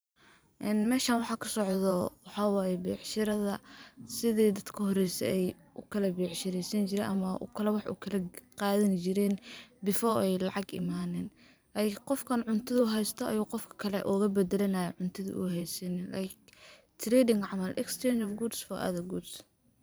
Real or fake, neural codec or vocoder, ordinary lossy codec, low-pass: fake; vocoder, 44.1 kHz, 128 mel bands every 512 samples, BigVGAN v2; none; none